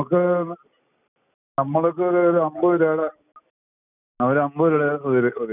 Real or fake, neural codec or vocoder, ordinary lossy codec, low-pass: real; none; none; 3.6 kHz